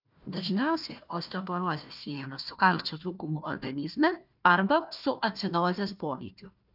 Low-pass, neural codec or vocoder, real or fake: 5.4 kHz; codec, 16 kHz, 1 kbps, FunCodec, trained on Chinese and English, 50 frames a second; fake